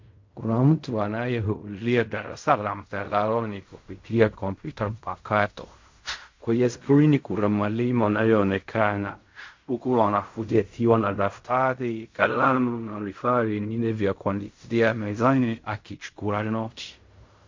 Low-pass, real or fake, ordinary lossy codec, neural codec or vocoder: 7.2 kHz; fake; MP3, 48 kbps; codec, 16 kHz in and 24 kHz out, 0.4 kbps, LongCat-Audio-Codec, fine tuned four codebook decoder